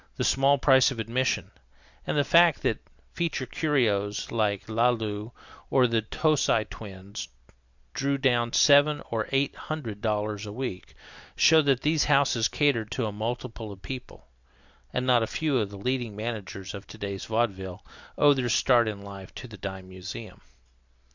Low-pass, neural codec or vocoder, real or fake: 7.2 kHz; none; real